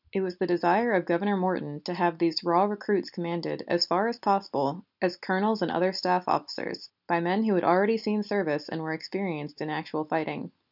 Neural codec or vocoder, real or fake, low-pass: none; real; 5.4 kHz